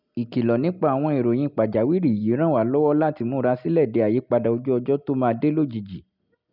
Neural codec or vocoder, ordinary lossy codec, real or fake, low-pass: none; none; real; 5.4 kHz